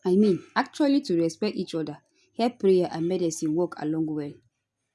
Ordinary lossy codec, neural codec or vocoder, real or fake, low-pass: none; none; real; none